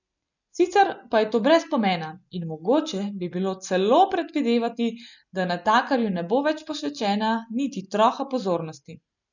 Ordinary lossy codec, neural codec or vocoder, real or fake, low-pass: none; none; real; 7.2 kHz